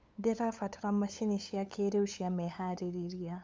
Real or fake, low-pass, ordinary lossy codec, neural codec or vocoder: fake; none; none; codec, 16 kHz, 8 kbps, FunCodec, trained on LibriTTS, 25 frames a second